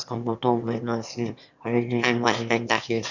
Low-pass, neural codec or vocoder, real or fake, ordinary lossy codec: 7.2 kHz; autoencoder, 22.05 kHz, a latent of 192 numbers a frame, VITS, trained on one speaker; fake; none